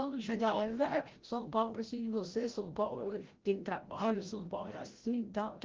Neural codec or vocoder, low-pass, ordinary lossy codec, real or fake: codec, 16 kHz, 0.5 kbps, FreqCodec, larger model; 7.2 kHz; Opus, 16 kbps; fake